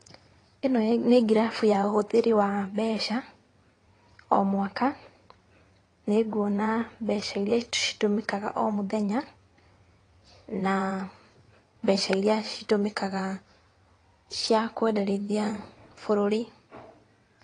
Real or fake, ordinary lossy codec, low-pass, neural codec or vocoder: fake; AAC, 32 kbps; 9.9 kHz; vocoder, 22.05 kHz, 80 mel bands, WaveNeXt